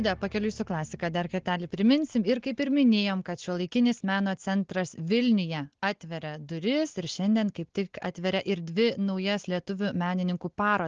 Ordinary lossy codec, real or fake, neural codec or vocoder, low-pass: Opus, 16 kbps; real; none; 7.2 kHz